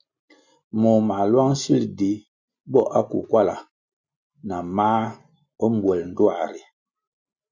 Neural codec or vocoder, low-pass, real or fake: none; 7.2 kHz; real